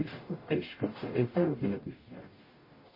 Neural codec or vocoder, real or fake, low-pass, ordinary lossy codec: codec, 44.1 kHz, 0.9 kbps, DAC; fake; 5.4 kHz; MP3, 24 kbps